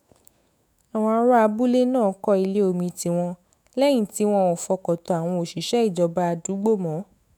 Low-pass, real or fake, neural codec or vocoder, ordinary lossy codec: none; fake; autoencoder, 48 kHz, 128 numbers a frame, DAC-VAE, trained on Japanese speech; none